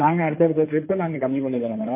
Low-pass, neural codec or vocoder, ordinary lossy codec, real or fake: 3.6 kHz; codec, 16 kHz in and 24 kHz out, 2.2 kbps, FireRedTTS-2 codec; MP3, 24 kbps; fake